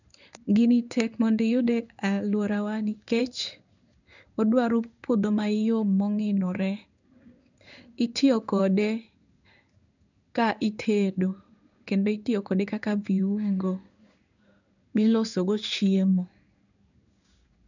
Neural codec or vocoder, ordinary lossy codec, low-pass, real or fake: codec, 16 kHz in and 24 kHz out, 1 kbps, XY-Tokenizer; none; 7.2 kHz; fake